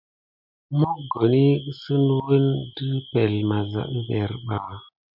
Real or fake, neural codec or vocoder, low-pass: real; none; 5.4 kHz